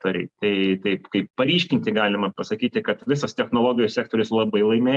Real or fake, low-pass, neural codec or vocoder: real; 10.8 kHz; none